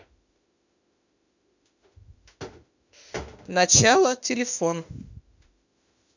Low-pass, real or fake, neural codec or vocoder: 7.2 kHz; fake; autoencoder, 48 kHz, 32 numbers a frame, DAC-VAE, trained on Japanese speech